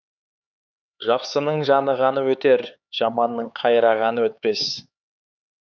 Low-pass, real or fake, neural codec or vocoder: 7.2 kHz; fake; codec, 16 kHz, 4 kbps, X-Codec, HuBERT features, trained on LibriSpeech